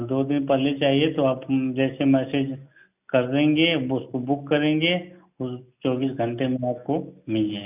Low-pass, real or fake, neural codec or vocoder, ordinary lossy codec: 3.6 kHz; real; none; none